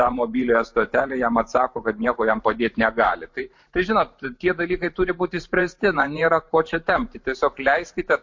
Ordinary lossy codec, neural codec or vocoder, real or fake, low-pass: MP3, 48 kbps; vocoder, 44.1 kHz, 128 mel bands every 512 samples, BigVGAN v2; fake; 7.2 kHz